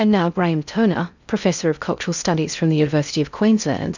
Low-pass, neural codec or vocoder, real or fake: 7.2 kHz; codec, 16 kHz in and 24 kHz out, 0.6 kbps, FocalCodec, streaming, 2048 codes; fake